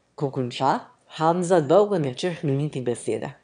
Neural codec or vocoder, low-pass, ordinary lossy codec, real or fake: autoencoder, 22.05 kHz, a latent of 192 numbers a frame, VITS, trained on one speaker; 9.9 kHz; none; fake